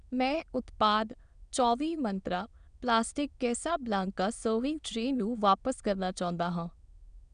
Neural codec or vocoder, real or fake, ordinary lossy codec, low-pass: autoencoder, 22.05 kHz, a latent of 192 numbers a frame, VITS, trained on many speakers; fake; none; 9.9 kHz